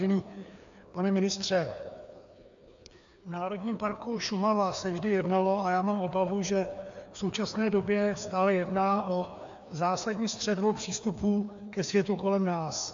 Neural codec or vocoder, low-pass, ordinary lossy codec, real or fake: codec, 16 kHz, 2 kbps, FreqCodec, larger model; 7.2 kHz; AAC, 64 kbps; fake